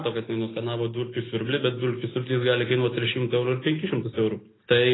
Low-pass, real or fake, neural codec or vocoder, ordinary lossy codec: 7.2 kHz; real; none; AAC, 16 kbps